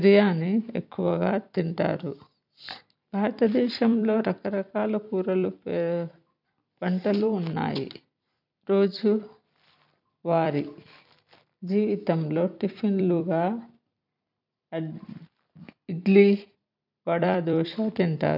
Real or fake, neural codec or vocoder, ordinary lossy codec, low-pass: fake; vocoder, 44.1 kHz, 128 mel bands every 256 samples, BigVGAN v2; none; 5.4 kHz